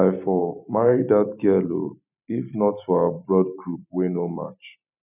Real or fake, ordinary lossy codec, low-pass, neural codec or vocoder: fake; none; 3.6 kHz; vocoder, 44.1 kHz, 128 mel bands every 512 samples, BigVGAN v2